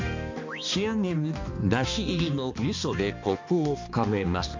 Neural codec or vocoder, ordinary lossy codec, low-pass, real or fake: codec, 16 kHz, 2 kbps, X-Codec, HuBERT features, trained on balanced general audio; MP3, 48 kbps; 7.2 kHz; fake